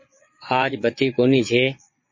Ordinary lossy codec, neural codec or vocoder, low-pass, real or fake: MP3, 32 kbps; vocoder, 22.05 kHz, 80 mel bands, Vocos; 7.2 kHz; fake